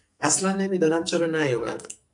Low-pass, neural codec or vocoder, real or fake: 10.8 kHz; codec, 44.1 kHz, 2.6 kbps, SNAC; fake